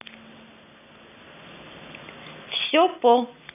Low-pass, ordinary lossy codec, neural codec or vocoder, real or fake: 3.6 kHz; none; none; real